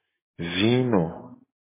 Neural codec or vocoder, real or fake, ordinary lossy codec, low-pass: none; real; MP3, 16 kbps; 3.6 kHz